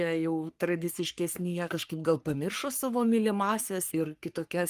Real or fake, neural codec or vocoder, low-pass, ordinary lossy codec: fake; codec, 44.1 kHz, 3.4 kbps, Pupu-Codec; 14.4 kHz; Opus, 32 kbps